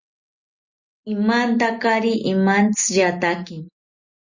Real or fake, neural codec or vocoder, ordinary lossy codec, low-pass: real; none; Opus, 64 kbps; 7.2 kHz